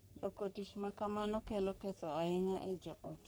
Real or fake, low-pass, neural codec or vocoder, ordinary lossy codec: fake; none; codec, 44.1 kHz, 3.4 kbps, Pupu-Codec; none